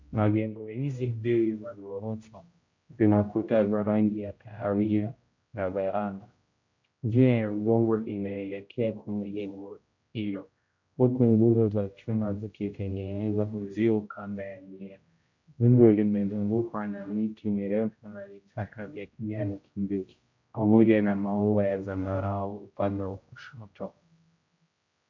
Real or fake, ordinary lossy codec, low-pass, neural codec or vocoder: fake; MP3, 48 kbps; 7.2 kHz; codec, 16 kHz, 0.5 kbps, X-Codec, HuBERT features, trained on general audio